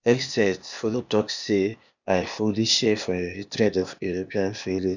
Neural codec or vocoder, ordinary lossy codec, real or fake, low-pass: codec, 16 kHz, 0.8 kbps, ZipCodec; none; fake; 7.2 kHz